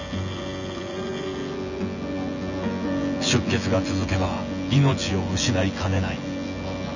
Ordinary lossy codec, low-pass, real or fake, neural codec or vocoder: none; 7.2 kHz; fake; vocoder, 24 kHz, 100 mel bands, Vocos